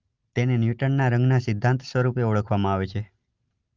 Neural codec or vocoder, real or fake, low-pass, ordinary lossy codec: none; real; 7.2 kHz; Opus, 24 kbps